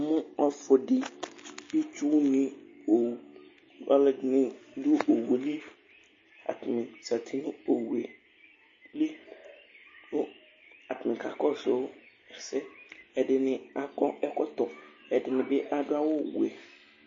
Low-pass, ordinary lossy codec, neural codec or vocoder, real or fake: 7.2 kHz; MP3, 32 kbps; none; real